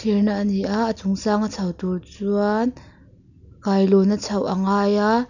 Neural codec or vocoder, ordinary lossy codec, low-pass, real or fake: none; none; 7.2 kHz; real